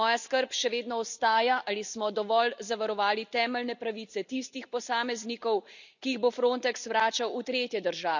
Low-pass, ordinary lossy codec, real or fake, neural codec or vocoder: 7.2 kHz; none; real; none